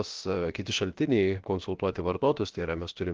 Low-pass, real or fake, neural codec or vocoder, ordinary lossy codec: 7.2 kHz; fake; codec, 16 kHz, about 1 kbps, DyCAST, with the encoder's durations; Opus, 16 kbps